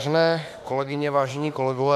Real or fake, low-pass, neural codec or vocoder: fake; 14.4 kHz; autoencoder, 48 kHz, 32 numbers a frame, DAC-VAE, trained on Japanese speech